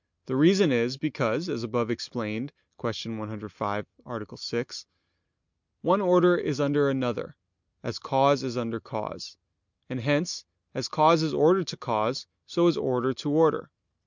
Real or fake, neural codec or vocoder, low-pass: real; none; 7.2 kHz